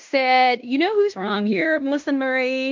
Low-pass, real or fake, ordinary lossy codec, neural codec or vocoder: 7.2 kHz; fake; MP3, 48 kbps; codec, 16 kHz in and 24 kHz out, 0.9 kbps, LongCat-Audio-Codec, fine tuned four codebook decoder